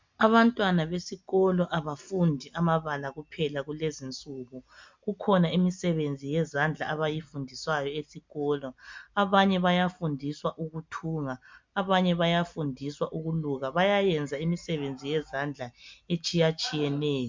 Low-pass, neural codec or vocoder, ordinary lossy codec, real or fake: 7.2 kHz; none; MP3, 64 kbps; real